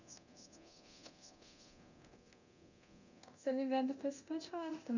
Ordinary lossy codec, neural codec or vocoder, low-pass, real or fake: none; codec, 24 kHz, 0.9 kbps, DualCodec; 7.2 kHz; fake